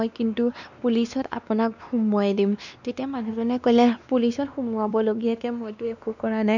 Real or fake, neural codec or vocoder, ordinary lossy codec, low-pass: fake; codec, 16 kHz, 2 kbps, X-Codec, WavLM features, trained on Multilingual LibriSpeech; none; 7.2 kHz